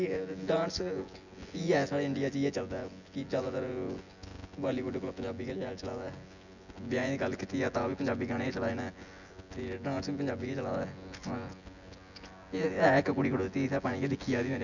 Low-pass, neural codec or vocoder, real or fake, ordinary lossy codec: 7.2 kHz; vocoder, 24 kHz, 100 mel bands, Vocos; fake; none